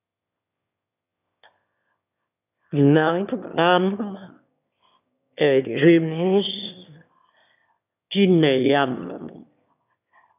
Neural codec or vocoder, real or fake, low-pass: autoencoder, 22.05 kHz, a latent of 192 numbers a frame, VITS, trained on one speaker; fake; 3.6 kHz